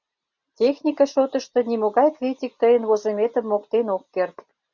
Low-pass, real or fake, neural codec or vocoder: 7.2 kHz; real; none